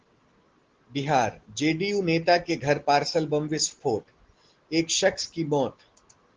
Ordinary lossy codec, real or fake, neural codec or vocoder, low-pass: Opus, 16 kbps; real; none; 7.2 kHz